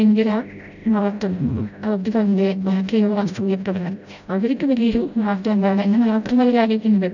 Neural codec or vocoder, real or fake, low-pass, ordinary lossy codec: codec, 16 kHz, 0.5 kbps, FreqCodec, smaller model; fake; 7.2 kHz; none